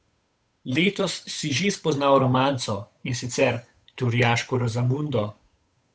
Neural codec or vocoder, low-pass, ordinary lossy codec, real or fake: codec, 16 kHz, 8 kbps, FunCodec, trained on Chinese and English, 25 frames a second; none; none; fake